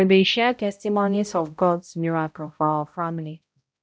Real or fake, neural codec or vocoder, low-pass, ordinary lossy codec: fake; codec, 16 kHz, 0.5 kbps, X-Codec, HuBERT features, trained on balanced general audio; none; none